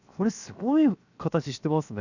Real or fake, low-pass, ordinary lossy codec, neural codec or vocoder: fake; 7.2 kHz; none; codec, 16 kHz, 0.7 kbps, FocalCodec